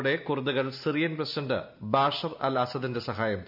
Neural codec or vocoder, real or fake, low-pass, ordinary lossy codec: none; real; 5.4 kHz; MP3, 48 kbps